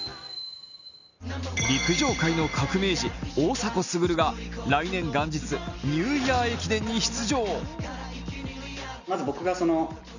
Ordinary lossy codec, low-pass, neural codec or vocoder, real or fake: none; 7.2 kHz; none; real